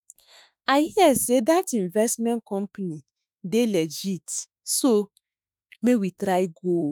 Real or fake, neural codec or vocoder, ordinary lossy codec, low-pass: fake; autoencoder, 48 kHz, 32 numbers a frame, DAC-VAE, trained on Japanese speech; none; none